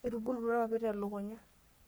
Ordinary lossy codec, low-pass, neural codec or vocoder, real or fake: none; none; codec, 44.1 kHz, 3.4 kbps, Pupu-Codec; fake